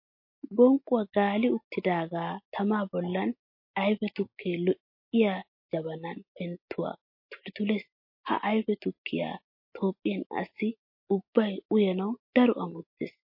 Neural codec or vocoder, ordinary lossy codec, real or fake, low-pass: none; MP3, 32 kbps; real; 5.4 kHz